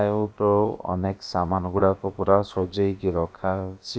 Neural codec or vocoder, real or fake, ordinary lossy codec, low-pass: codec, 16 kHz, about 1 kbps, DyCAST, with the encoder's durations; fake; none; none